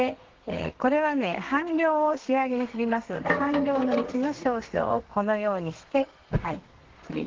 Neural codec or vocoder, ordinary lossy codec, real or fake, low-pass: codec, 32 kHz, 1.9 kbps, SNAC; Opus, 16 kbps; fake; 7.2 kHz